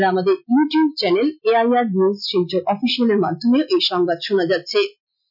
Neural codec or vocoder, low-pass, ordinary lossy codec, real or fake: none; 5.4 kHz; none; real